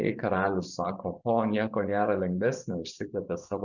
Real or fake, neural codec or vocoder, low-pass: fake; vocoder, 24 kHz, 100 mel bands, Vocos; 7.2 kHz